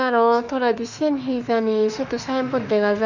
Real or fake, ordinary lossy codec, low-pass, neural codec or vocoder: fake; none; 7.2 kHz; autoencoder, 48 kHz, 32 numbers a frame, DAC-VAE, trained on Japanese speech